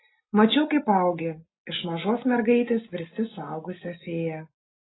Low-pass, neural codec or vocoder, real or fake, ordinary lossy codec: 7.2 kHz; none; real; AAC, 16 kbps